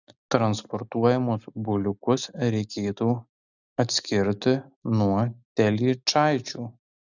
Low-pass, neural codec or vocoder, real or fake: 7.2 kHz; none; real